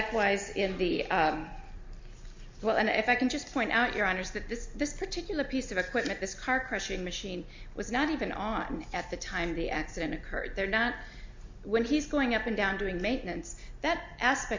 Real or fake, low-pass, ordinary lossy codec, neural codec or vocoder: real; 7.2 kHz; MP3, 48 kbps; none